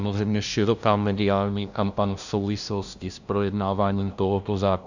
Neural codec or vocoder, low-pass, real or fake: codec, 16 kHz, 0.5 kbps, FunCodec, trained on LibriTTS, 25 frames a second; 7.2 kHz; fake